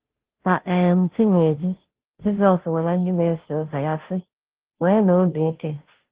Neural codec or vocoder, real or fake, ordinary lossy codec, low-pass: codec, 16 kHz, 0.5 kbps, FunCodec, trained on Chinese and English, 25 frames a second; fake; Opus, 16 kbps; 3.6 kHz